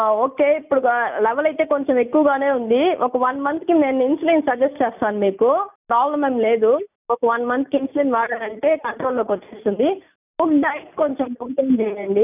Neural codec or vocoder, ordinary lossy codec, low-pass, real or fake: none; none; 3.6 kHz; real